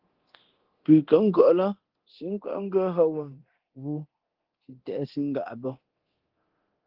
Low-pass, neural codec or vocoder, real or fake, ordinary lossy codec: 5.4 kHz; codec, 24 kHz, 0.9 kbps, DualCodec; fake; Opus, 16 kbps